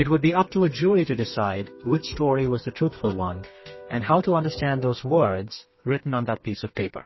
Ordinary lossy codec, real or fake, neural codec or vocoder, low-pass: MP3, 24 kbps; fake; codec, 32 kHz, 1.9 kbps, SNAC; 7.2 kHz